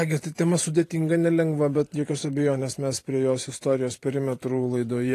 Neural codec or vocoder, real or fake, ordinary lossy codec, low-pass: vocoder, 44.1 kHz, 128 mel bands every 512 samples, BigVGAN v2; fake; AAC, 48 kbps; 14.4 kHz